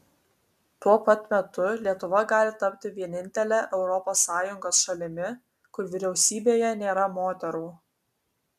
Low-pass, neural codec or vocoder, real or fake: 14.4 kHz; none; real